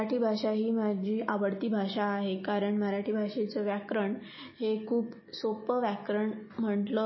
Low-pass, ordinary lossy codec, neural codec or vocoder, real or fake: 7.2 kHz; MP3, 24 kbps; none; real